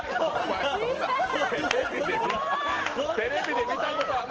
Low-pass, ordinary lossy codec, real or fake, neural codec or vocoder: 7.2 kHz; Opus, 16 kbps; real; none